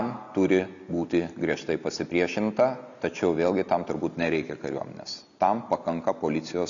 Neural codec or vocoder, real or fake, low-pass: none; real; 7.2 kHz